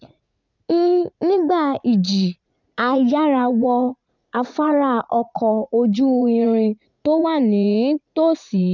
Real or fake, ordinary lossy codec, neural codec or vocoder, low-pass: fake; none; vocoder, 44.1 kHz, 80 mel bands, Vocos; 7.2 kHz